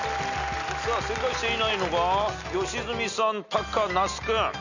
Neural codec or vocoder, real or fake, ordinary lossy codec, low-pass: none; real; none; 7.2 kHz